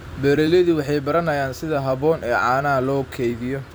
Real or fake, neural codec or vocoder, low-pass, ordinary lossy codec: fake; vocoder, 44.1 kHz, 128 mel bands every 256 samples, BigVGAN v2; none; none